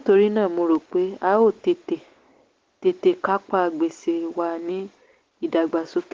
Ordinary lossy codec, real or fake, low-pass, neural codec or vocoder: Opus, 16 kbps; real; 7.2 kHz; none